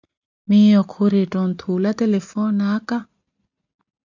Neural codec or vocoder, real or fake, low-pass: none; real; 7.2 kHz